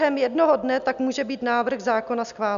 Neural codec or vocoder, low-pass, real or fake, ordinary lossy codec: none; 7.2 kHz; real; MP3, 96 kbps